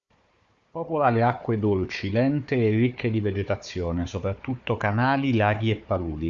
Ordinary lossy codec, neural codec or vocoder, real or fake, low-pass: Opus, 64 kbps; codec, 16 kHz, 4 kbps, FunCodec, trained on Chinese and English, 50 frames a second; fake; 7.2 kHz